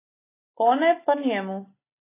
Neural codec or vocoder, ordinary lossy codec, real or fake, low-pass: none; AAC, 24 kbps; real; 3.6 kHz